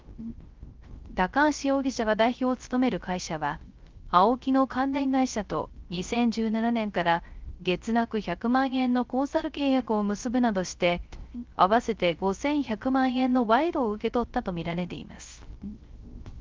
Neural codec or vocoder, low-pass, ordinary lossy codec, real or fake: codec, 16 kHz, 0.3 kbps, FocalCodec; 7.2 kHz; Opus, 32 kbps; fake